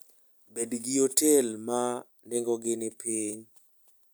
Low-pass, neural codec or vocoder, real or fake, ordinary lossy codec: none; none; real; none